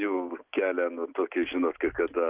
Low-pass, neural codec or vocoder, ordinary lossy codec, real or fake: 3.6 kHz; none; Opus, 24 kbps; real